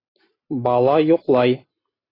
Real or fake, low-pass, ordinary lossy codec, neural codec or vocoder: fake; 5.4 kHz; AAC, 24 kbps; vocoder, 44.1 kHz, 128 mel bands every 256 samples, BigVGAN v2